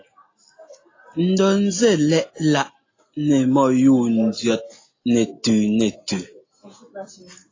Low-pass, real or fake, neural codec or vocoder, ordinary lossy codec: 7.2 kHz; real; none; AAC, 32 kbps